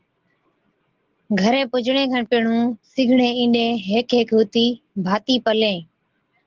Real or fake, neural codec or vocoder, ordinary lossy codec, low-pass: real; none; Opus, 16 kbps; 7.2 kHz